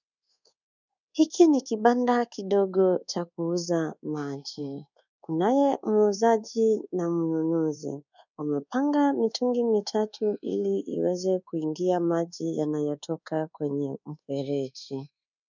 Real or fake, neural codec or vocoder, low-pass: fake; codec, 24 kHz, 1.2 kbps, DualCodec; 7.2 kHz